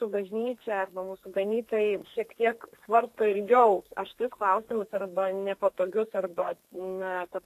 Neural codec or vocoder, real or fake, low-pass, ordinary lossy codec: codec, 32 kHz, 1.9 kbps, SNAC; fake; 14.4 kHz; AAC, 64 kbps